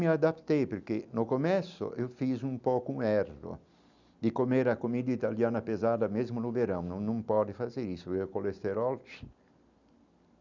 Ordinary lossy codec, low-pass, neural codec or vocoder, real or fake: none; 7.2 kHz; none; real